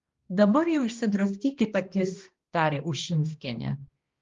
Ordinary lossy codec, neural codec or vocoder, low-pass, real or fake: Opus, 32 kbps; codec, 16 kHz, 1 kbps, X-Codec, HuBERT features, trained on balanced general audio; 7.2 kHz; fake